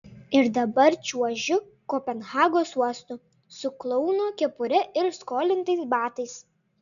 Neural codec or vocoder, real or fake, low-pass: none; real; 7.2 kHz